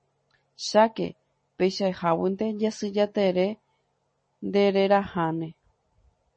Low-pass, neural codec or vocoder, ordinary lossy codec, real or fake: 9.9 kHz; none; MP3, 32 kbps; real